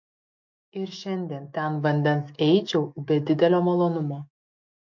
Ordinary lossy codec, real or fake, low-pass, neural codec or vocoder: MP3, 48 kbps; fake; 7.2 kHz; autoencoder, 48 kHz, 128 numbers a frame, DAC-VAE, trained on Japanese speech